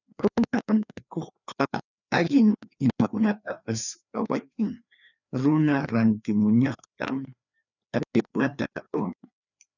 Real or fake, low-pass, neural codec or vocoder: fake; 7.2 kHz; codec, 16 kHz, 2 kbps, FreqCodec, larger model